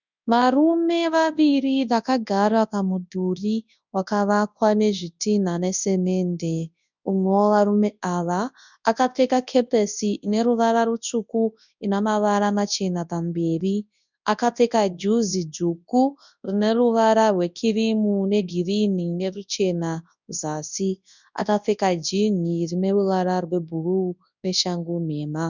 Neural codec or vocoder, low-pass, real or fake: codec, 24 kHz, 0.9 kbps, WavTokenizer, large speech release; 7.2 kHz; fake